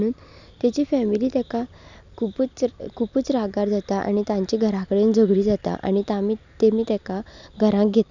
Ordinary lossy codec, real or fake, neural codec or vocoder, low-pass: none; real; none; 7.2 kHz